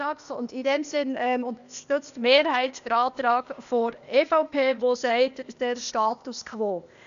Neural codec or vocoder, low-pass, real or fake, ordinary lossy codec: codec, 16 kHz, 0.8 kbps, ZipCodec; 7.2 kHz; fake; none